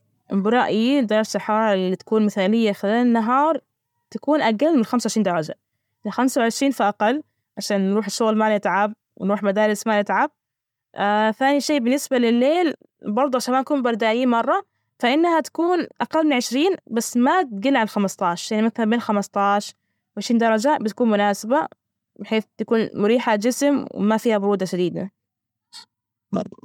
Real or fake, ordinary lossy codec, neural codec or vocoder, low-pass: real; MP3, 96 kbps; none; 19.8 kHz